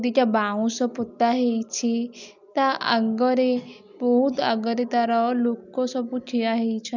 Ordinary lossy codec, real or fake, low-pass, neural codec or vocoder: none; real; 7.2 kHz; none